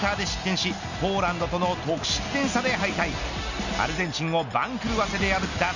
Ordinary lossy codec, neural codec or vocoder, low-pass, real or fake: none; none; 7.2 kHz; real